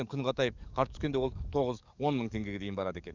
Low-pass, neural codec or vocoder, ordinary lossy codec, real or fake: 7.2 kHz; codec, 44.1 kHz, 7.8 kbps, DAC; none; fake